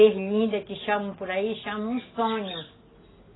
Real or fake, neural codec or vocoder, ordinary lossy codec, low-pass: real; none; AAC, 16 kbps; 7.2 kHz